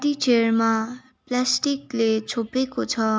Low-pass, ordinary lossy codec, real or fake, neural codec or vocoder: none; none; real; none